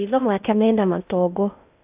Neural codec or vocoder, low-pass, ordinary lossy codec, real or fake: codec, 16 kHz in and 24 kHz out, 0.6 kbps, FocalCodec, streaming, 4096 codes; 3.6 kHz; AAC, 32 kbps; fake